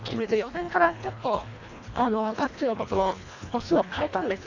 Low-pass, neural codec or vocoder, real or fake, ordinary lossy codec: 7.2 kHz; codec, 24 kHz, 1.5 kbps, HILCodec; fake; AAC, 48 kbps